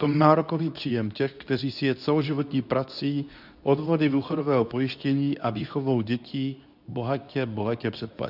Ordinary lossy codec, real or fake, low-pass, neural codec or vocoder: AAC, 48 kbps; fake; 5.4 kHz; codec, 24 kHz, 0.9 kbps, WavTokenizer, medium speech release version 2